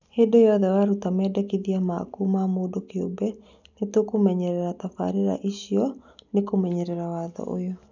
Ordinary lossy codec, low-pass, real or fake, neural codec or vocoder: none; 7.2 kHz; real; none